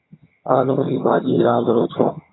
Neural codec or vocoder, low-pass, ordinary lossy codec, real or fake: vocoder, 22.05 kHz, 80 mel bands, HiFi-GAN; 7.2 kHz; AAC, 16 kbps; fake